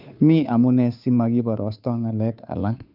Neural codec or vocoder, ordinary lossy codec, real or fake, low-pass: codec, 16 kHz, 2 kbps, FunCodec, trained on Chinese and English, 25 frames a second; none; fake; 5.4 kHz